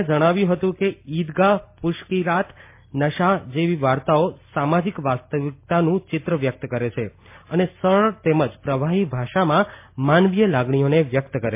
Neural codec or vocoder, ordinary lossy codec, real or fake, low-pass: none; MP3, 24 kbps; real; 3.6 kHz